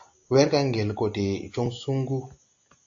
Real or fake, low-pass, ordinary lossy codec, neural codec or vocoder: real; 7.2 kHz; AAC, 48 kbps; none